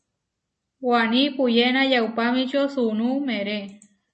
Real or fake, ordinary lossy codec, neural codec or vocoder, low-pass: real; MP3, 32 kbps; none; 10.8 kHz